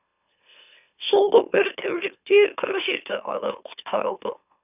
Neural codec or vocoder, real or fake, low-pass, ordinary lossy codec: autoencoder, 44.1 kHz, a latent of 192 numbers a frame, MeloTTS; fake; 3.6 kHz; none